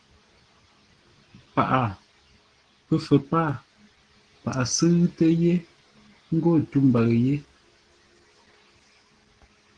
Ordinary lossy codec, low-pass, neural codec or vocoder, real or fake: Opus, 16 kbps; 9.9 kHz; none; real